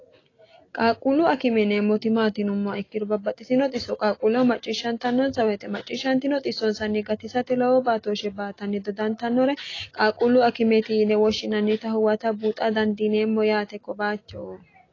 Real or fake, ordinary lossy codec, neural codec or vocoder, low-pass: real; AAC, 32 kbps; none; 7.2 kHz